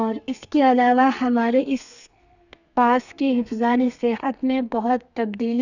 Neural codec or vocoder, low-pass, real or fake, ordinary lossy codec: codec, 32 kHz, 1.9 kbps, SNAC; 7.2 kHz; fake; none